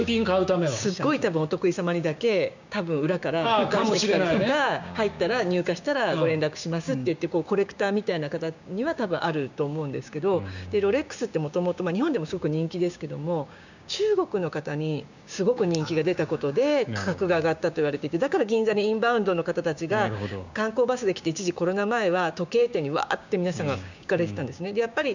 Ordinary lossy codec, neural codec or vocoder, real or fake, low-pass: none; codec, 16 kHz, 6 kbps, DAC; fake; 7.2 kHz